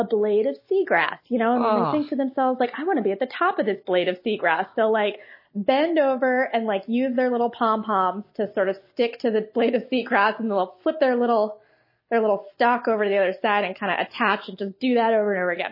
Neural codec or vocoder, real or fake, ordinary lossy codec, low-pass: none; real; MP3, 24 kbps; 5.4 kHz